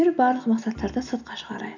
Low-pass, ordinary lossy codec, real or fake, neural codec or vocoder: 7.2 kHz; none; real; none